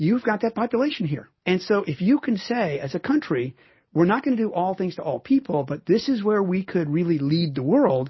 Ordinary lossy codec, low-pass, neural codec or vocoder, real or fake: MP3, 24 kbps; 7.2 kHz; none; real